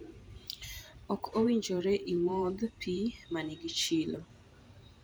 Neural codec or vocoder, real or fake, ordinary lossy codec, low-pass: vocoder, 44.1 kHz, 128 mel bands every 512 samples, BigVGAN v2; fake; none; none